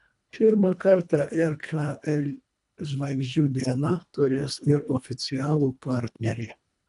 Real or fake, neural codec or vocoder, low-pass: fake; codec, 24 kHz, 1.5 kbps, HILCodec; 10.8 kHz